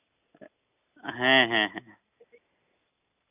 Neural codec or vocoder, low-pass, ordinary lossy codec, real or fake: none; 3.6 kHz; none; real